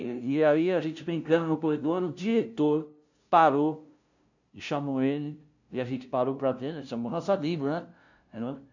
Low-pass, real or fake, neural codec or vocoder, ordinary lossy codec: 7.2 kHz; fake; codec, 16 kHz, 0.5 kbps, FunCodec, trained on LibriTTS, 25 frames a second; none